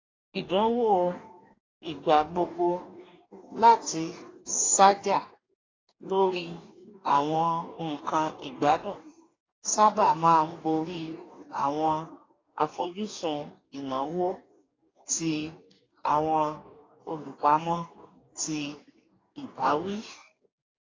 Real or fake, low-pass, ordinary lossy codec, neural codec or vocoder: fake; 7.2 kHz; AAC, 32 kbps; codec, 44.1 kHz, 2.6 kbps, DAC